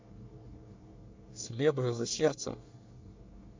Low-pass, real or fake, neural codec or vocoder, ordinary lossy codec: 7.2 kHz; fake; codec, 24 kHz, 1 kbps, SNAC; MP3, 64 kbps